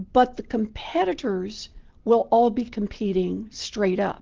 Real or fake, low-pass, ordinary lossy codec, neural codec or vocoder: real; 7.2 kHz; Opus, 16 kbps; none